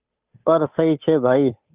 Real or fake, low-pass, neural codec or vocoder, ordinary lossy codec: fake; 3.6 kHz; codec, 16 kHz, 8 kbps, FunCodec, trained on Chinese and English, 25 frames a second; Opus, 32 kbps